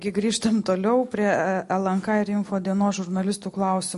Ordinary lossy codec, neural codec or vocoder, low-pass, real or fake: MP3, 48 kbps; none; 14.4 kHz; real